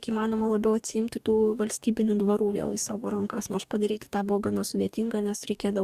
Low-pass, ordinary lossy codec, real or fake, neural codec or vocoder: 14.4 kHz; Opus, 64 kbps; fake; codec, 44.1 kHz, 2.6 kbps, DAC